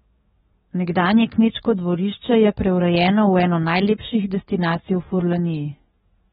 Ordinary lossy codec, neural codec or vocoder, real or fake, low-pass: AAC, 16 kbps; codec, 44.1 kHz, 7.8 kbps, DAC; fake; 19.8 kHz